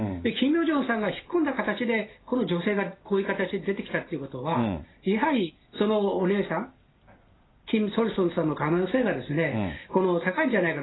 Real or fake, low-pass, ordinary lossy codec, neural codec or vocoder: real; 7.2 kHz; AAC, 16 kbps; none